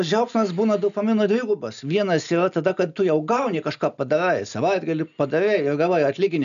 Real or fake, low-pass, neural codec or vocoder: real; 7.2 kHz; none